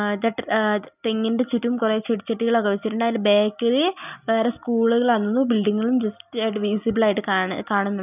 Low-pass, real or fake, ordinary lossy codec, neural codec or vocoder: 3.6 kHz; real; none; none